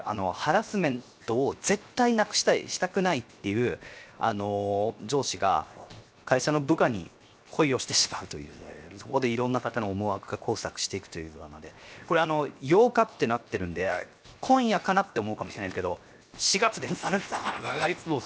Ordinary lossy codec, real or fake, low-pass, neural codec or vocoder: none; fake; none; codec, 16 kHz, 0.7 kbps, FocalCodec